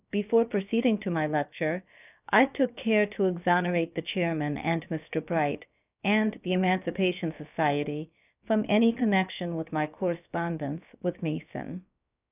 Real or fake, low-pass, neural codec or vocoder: fake; 3.6 kHz; codec, 16 kHz, about 1 kbps, DyCAST, with the encoder's durations